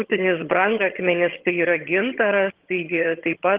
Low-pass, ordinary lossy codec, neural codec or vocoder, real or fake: 3.6 kHz; Opus, 16 kbps; vocoder, 22.05 kHz, 80 mel bands, HiFi-GAN; fake